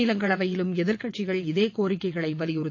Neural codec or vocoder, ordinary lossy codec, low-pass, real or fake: vocoder, 22.05 kHz, 80 mel bands, WaveNeXt; AAC, 48 kbps; 7.2 kHz; fake